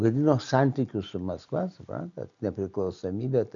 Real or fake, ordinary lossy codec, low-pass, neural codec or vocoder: real; MP3, 64 kbps; 7.2 kHz; none